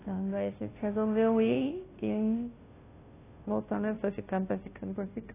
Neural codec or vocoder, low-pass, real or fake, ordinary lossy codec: codec, 16 kHz, 0.5 kbps, FunCodec, trained on Chinese and English, 25 frames a second; 3.6 kHz; fake; AAC, 16 kbps